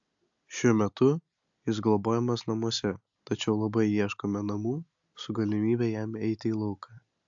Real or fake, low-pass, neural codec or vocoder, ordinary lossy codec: real; 7.2 kHz; none; AAC, 64 kbps